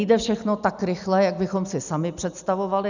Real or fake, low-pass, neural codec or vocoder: real; 7.2 kHz; none